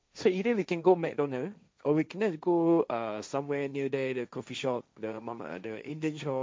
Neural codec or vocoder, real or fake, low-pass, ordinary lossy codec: codec, 16 kHz, 1.1 kbps, Voila-Tokenizer; fake; none; none